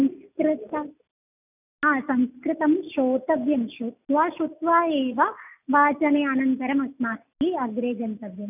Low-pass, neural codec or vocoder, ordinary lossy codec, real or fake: 3.6 kHz; none; none; real